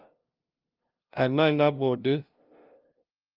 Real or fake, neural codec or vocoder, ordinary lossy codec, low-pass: fake; codec, 16 kHz, 0.5 kbps, FunCodec, trained on LibriTTS, 25 frames a second; Opus, 24 kbps; 5.4 kHz